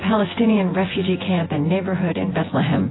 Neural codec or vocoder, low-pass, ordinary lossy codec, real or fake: vocoder, 24 kHz, 100 mel bands, Vocos; 7.2 kHz; AAC, 16 kbps; fake